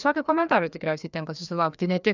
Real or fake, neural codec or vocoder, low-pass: fake; codec, 16 kHz, 2 kbps, FreqCodec, larger model; 7.2 kHz